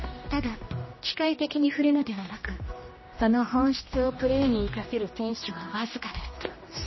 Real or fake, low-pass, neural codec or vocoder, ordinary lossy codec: fake; 7.2 kHz; codec, 16 kHz, 1 kbps, X-Codec, HuBERT features, trained on general audio; MP3, 24 kbps